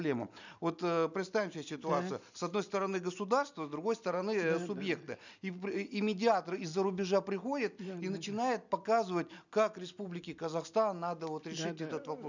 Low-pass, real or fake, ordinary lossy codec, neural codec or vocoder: 7.2 kHz; real; none; none